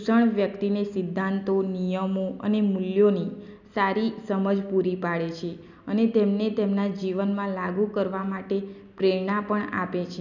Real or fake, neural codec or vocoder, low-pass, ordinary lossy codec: real; none; 7.2 kHz; none